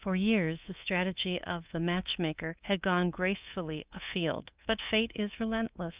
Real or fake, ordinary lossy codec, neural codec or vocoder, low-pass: fake; Opus, 32 kbps; codec, 24 kHz, 3.1 kbps, DualCodec; 3.6 kHz